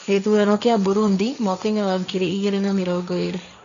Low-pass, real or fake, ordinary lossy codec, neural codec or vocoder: 7.2 kHz; fake; none; codec, 16 kHz, 1.1 kbps, Voila-Tokenizer